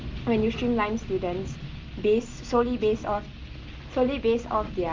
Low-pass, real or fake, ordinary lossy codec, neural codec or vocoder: 7.2 kHz; real; Opus, 24 kbps; none